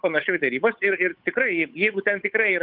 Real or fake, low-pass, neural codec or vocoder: fake; 5.4 kHz; codec, 16 kHz, 8 kbps, FunCodec, trained on Chinese and English, 25 frames a second